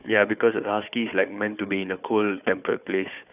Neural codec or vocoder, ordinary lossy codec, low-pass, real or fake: codec, 16 kHz, 4 kbps, FunCodec, trained on Chinese and English, 50 frames a second; none; 3.6 kHz; fake